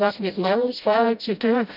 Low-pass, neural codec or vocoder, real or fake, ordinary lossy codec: 5.4 kHz; codec, 16 kHz, 0.5 kbps, FreqCodec, smaller model; fake; none